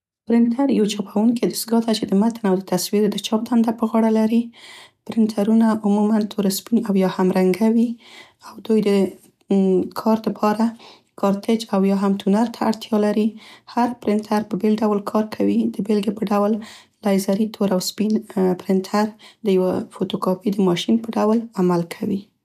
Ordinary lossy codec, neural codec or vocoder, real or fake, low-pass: none; none; real; 14.4 kHz